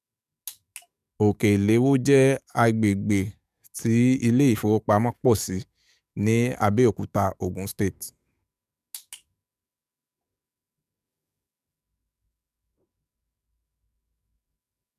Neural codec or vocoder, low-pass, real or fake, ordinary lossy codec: codec, 44.1 kHz, 7.8 kbps, DAC; 14.4 kHz; fake; none